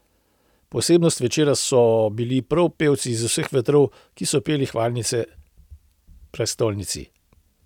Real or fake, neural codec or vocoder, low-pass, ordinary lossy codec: real; none; 19.8 kHz; none